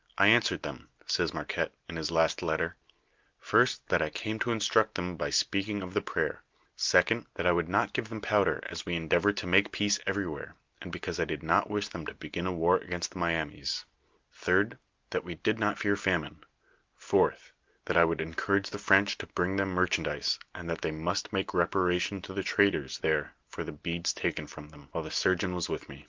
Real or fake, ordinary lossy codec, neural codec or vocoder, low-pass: real; Opus, 32 kbps; none; 7.2 kHz